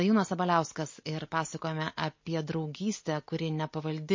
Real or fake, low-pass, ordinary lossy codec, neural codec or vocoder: real; 7.2 kHz; MP3, 32 kbps; none